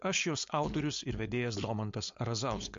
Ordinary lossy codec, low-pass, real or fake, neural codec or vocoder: MP3, 48 kbps; 7.2 kHz; fake; codec, 16 kHz, 4.8 kbps, FACodec